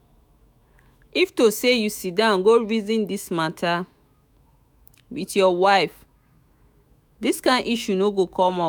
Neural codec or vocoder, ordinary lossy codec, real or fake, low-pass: autoencoder, 48 kHz, 128 numbers a frame, DAC-VAE, trained on Japanese speech; none; fake; none